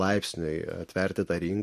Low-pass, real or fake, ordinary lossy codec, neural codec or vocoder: 14.4 kHz; real; MP3, 64 kbps; none